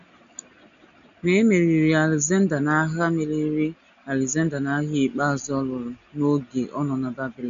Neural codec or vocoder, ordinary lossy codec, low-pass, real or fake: none; none; 7.2 kHz; real